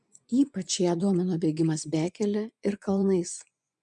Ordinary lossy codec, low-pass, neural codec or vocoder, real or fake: MP3, 96 kbps; 10.8 kHz; vocoder, 24 kHz, 100 mel bands, Vocos; fake